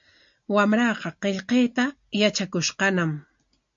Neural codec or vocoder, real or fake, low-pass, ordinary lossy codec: none; real; 7.2 kHz; MP3, 48 kbps